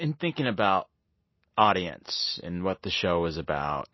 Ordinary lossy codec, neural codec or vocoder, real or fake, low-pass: MP3, 24 kbps; none; real; 7.2 kHz